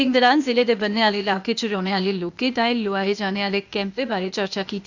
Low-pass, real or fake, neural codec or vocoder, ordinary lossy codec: 7.2 kHz; fake; codec, 16 kHz, 0.8 kbps, ZipCodec; none